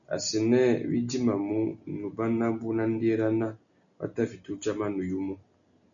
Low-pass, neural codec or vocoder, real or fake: 7.2 kHz; none; real